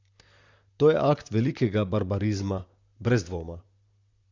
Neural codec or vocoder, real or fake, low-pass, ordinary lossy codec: vocoder, 44.1 kHz, 128 mel bands, Pupu-Vocoder; fake; 7.2 kHz; Opus, 64 kbps